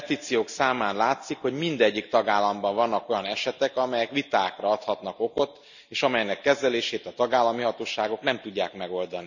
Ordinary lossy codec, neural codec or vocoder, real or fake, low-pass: none; none; real; 7.2 kHz